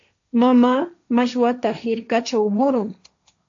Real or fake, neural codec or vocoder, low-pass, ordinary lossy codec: fake; codec, 16 kHz, 1.1 kbps, Voila-Tokenizer; 7.2 kHz; AAC, 64 kbps